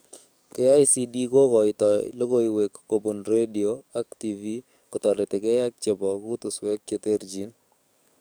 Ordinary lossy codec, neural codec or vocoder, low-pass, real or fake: none; codec, 44.1 kHz, 7.8 kbps, DAC; none; fake